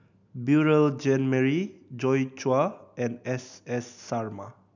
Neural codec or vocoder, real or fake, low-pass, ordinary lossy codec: none; real; 7.2 kHz; none